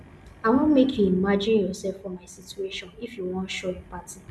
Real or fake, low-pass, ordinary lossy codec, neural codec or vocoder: real; none; none; none